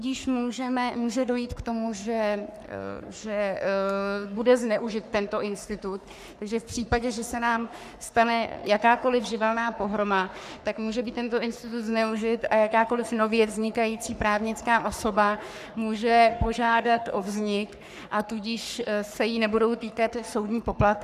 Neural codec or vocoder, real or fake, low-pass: codec, 44.1 kHz, 3.4 kbps, Pupu-Codec; fake; 14.4 kHz